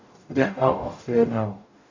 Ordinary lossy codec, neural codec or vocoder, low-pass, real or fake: AAC, 48 kbps; codec, 44.1 kHz, 0.9 kbps, DAC; 7.2 kHz; fake